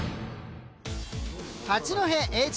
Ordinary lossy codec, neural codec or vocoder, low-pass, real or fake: none; none; none; real